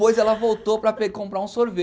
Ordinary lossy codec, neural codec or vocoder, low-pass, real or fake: none; none; none; real